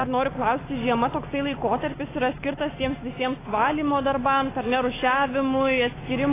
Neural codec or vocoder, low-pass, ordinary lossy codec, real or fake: autoencoder, 48 kHz, 128 numbers a frame, DAC-VAE, trained on Japanese speech; 3.6 kHz; AAC, 16 kbps; fake